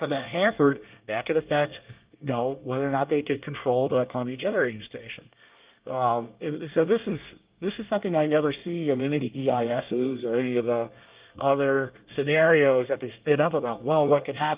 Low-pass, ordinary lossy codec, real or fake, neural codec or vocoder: 3.6 kHz; Opus, 32 kbps; fake; codec, 24 kHz, 1 kbps, SNAC